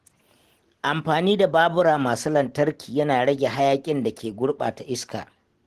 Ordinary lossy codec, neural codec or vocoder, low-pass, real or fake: Opus, 16 kbps; none; 14.4 kHz; real